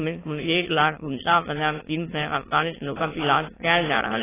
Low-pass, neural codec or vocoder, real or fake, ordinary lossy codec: 3.6 kHz; autoencoder, 22.05 kHz, a latent of 192 numbers a frame, VITS, trained on many speakers; fake; AAC, 16 kbps